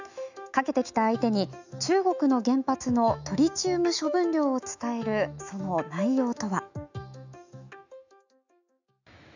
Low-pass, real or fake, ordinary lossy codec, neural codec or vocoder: 7.2 kHz; fake; none; autoencoder, 48 kHz, 128 numbers a frame, DAC-VAE, trained on Japanese speech